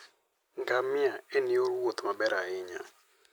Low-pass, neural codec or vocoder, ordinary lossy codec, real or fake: none; none; none; real